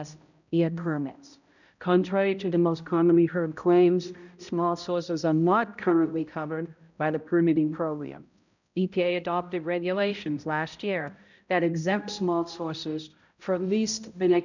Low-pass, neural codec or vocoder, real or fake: 7.2 kHz; codec, 16 kHz, 0.5 kbps, X-Codec, HuBERT features, trained on balanced general audio; fake